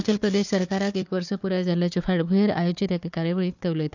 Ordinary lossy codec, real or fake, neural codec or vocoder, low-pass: none; fake; codec, 16 kHz, 4 kbps, FunCodec, trained on Chinese and English, 50 frames a second; 7.2 kHz